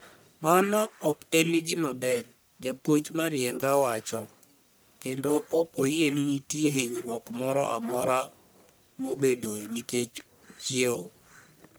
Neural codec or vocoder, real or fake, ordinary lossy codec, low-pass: codec, 44.1 kHz, 1.7 kbps, Pupu-Codec; fake; none; none